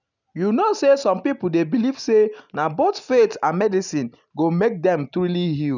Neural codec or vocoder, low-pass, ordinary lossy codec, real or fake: none; 7.2 kHz; none; real